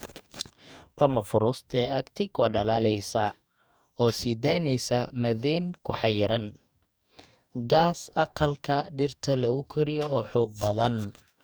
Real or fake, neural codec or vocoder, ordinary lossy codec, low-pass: fake; codec, 44.1 kHz, 2.6 kbps, DAC; none; none